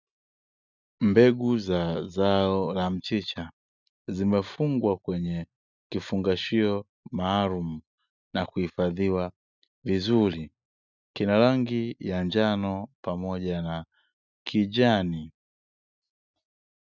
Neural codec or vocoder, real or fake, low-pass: none; real; 7.2 kHz